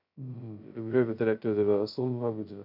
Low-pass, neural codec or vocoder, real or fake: 5.4 kHz; codec, 16 kHz, 0.2 kbps, FocalCodec; fake